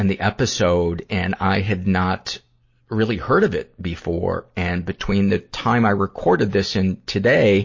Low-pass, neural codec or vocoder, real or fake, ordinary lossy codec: 7.2 kHz; none; real; MP3, 32 kbps